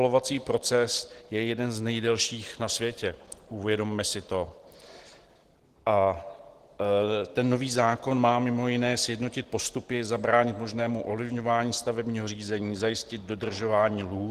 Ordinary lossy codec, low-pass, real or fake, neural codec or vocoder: Opus, 16 kbps; 14.4 kHz; real; none